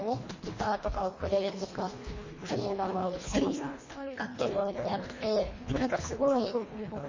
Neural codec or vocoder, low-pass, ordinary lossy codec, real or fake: codec, 24 kHz, 1.5 kbps, HILCodec; 7.2 kHz; MP3, 32 kbps; fake